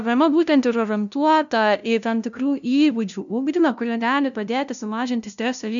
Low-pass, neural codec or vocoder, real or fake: 7.2 kHz; codec, 16 kHz, 0.5 kbps, FunCodec, trained on LibriTTS, 25 frames a second; fake